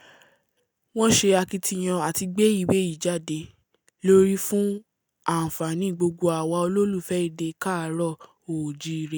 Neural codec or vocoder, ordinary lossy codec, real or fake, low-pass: none; none; real; none